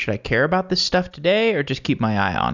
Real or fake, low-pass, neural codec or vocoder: real; 7.2 kHz; none